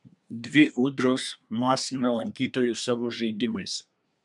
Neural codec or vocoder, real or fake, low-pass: codec, 24 kHz, 1 kbps, SNAC; fake; 10.8 kHz